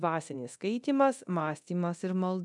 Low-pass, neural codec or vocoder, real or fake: 10.8 kHz; codec, 24 kHz, 0.9 kbps, DualCodec; fake